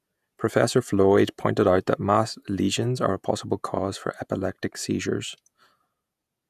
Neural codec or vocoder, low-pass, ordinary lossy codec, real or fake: vocoder, 48 kHz, 128 mel bands, Vocos; 14.4 kHz; none; fake